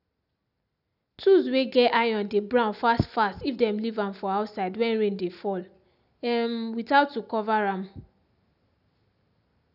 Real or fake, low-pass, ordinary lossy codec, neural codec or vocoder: real; 5.4 kHz; none; none